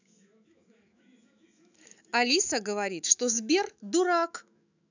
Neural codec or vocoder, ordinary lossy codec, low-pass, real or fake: autoencoder, 48 kHz, 128 numbers a frame, DAC-VAE, trained on Japanese speech; none; 7.2 kHz; fake